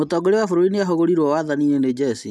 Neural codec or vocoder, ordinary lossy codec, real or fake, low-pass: none; none; real; none